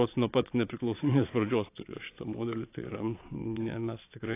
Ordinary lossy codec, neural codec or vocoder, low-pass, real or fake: AAC, 24 kbps; vocoder, 22.05 kHz, 80 mel bands, Vocos; 3.6 kHz; fake